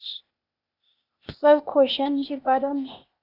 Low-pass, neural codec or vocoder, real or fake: 5.4 kHz; codec, 16 kHz, 0.8 kbps, ZipCodec; fake